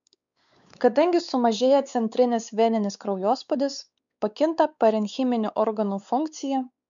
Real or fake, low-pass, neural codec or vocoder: fake; 7.2 kHz; codec, 16 kHz, 4 kbps, X-Codec, WavLM features, trained on Multilingual LibriSpeech